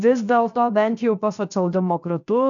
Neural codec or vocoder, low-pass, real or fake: codec, 16 kHz, 0.7 kbps, FocalCodec; 7.2 kHz; fake